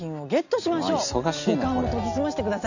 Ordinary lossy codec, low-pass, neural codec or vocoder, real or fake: none; 7.2 kHz; none; real